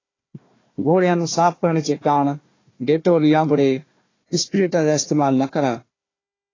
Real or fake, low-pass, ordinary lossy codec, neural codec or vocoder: fake; 7.2 kHz; AAC, 32 kbps; codec, 16 kHz, 1 kbps, FunCodec, trained on Chinese and English, 50 frames a second